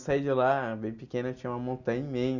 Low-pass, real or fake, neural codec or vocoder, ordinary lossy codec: 7.2 kHz; real; none; none